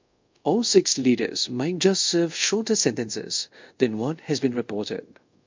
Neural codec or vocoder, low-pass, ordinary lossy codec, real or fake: codec, 24 kHz, 0.5 kbps, DualCodec; 7.2 kHz; MP3, 64 kbps; fake